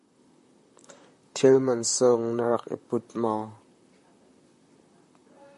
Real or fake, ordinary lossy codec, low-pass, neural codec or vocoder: fake; MP3, 48 kbps; 14.4 kHz; vocoder, 44.1 kHz, 128 mel bands, Pupu-Vocoder